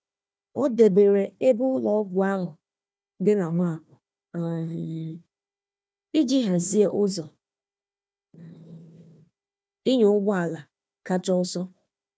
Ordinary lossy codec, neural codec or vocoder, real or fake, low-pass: none; codec, 16 kHz, 1 kbps, FunCodec, trained on Chinese and English, 50 frames a second; fake; none